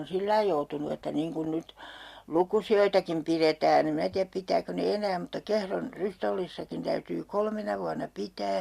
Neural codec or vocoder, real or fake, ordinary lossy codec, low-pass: none; real; Opus, 64 kbps; 14.4 kHz